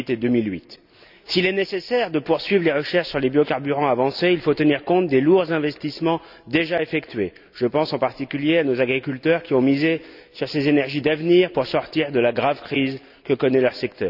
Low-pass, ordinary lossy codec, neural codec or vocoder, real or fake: 5.4 kHz; none; none; real